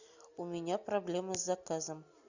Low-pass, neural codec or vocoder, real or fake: 7.2 kHz; none; real